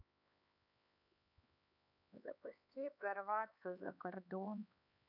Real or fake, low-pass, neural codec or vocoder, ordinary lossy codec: fake; 5.4 kHz; codec, 16 kHz, 4 kbps, X-Codec, HuBERT features, trained on LibriSpeech; none